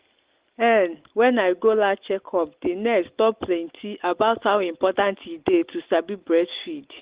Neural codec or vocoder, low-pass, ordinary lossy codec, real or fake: none; 3.6 kHz; Opus, 16 kbps; real